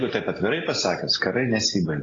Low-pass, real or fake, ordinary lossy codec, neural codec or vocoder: 7.2 kHz; real; AAC, 32 kbps; none